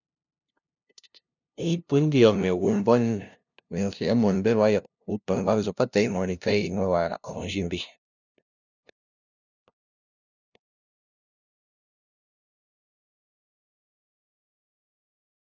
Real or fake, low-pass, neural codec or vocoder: fake; 7.2 kHz; codec, 16 kHz, 0.5 kbps, FunCodec, trained on LibriTTS, 25 frames a second